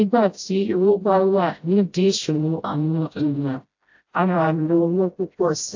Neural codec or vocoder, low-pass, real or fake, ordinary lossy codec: codec, 16 kHz, 0.5 kbps, FreqCodec, smaller model; 7.2 kHz; fake; AAC, 48 kbps